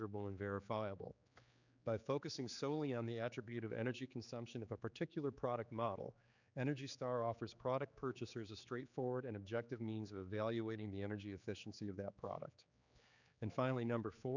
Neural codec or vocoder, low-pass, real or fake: codec, 16 kHz, 4 kbps, X-Codec, HuBERT features, trained on general audio; 7.2 kHz; fake